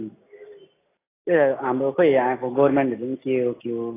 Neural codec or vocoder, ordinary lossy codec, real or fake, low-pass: none; AAC, 16 kbps; real; 3.6 kHz